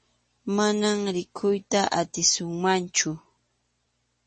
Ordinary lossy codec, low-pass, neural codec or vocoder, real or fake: MP3, 32 kbps; 10.8 kHz; none; real